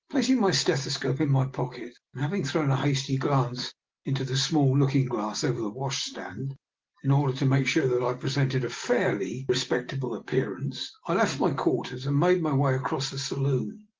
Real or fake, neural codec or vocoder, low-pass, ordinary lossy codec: real; none; 7.2 kHz; Opus, 16 kbps